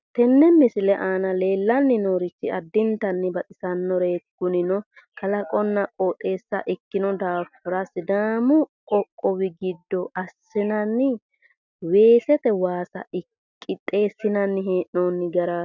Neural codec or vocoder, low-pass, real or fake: none; 7.2 kHz; real